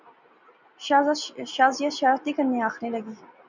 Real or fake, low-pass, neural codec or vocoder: real; 7.2 kHz; none